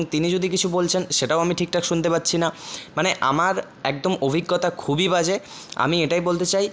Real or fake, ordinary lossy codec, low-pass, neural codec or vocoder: real; none; none; none